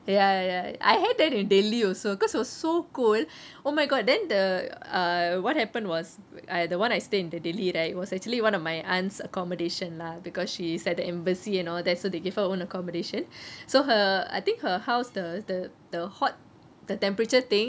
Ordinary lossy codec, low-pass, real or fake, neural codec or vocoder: none; none; real; none